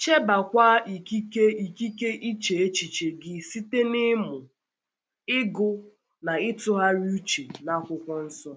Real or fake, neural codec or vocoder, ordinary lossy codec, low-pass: real; none; none; none